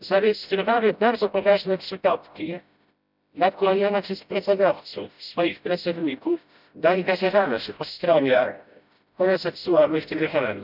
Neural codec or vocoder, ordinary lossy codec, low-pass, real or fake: codec, 16 kHz, 0.5 kbps, FreqCodec, smaller model; none; 5.4 kHz; fake